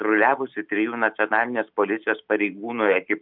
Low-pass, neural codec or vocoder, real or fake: 5.4 kHz; none; real